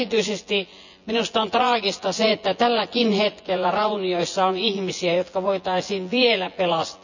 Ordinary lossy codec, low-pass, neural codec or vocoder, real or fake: none; 7.2 kHz; vocoder, 24 kHz, 100 mel bands, Vocos; fake